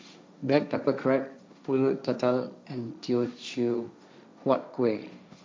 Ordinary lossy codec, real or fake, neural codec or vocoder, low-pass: none; fake; codec, 16 kHz, 1.1 kbps, Voila-Tokenizer; none